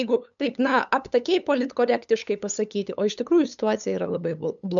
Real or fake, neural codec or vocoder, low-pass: fake; codec, 16 kHz, 8 kbps, FunCodec, trained on LibriTTS, 25 frames a second; 7.2 kHz